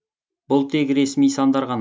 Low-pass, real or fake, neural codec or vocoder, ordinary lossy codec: none; real; none; none